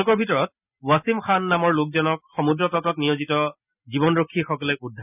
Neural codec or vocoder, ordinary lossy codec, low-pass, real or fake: none; none; 3.6 kHz; real